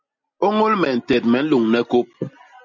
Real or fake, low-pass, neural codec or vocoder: real; 7.2 kHz; none